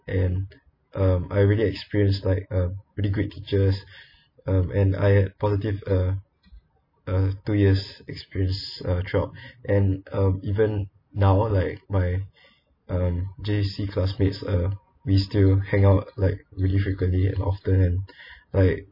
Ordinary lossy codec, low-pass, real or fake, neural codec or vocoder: MP3, 24 kbps; 5.4 kHz; real; none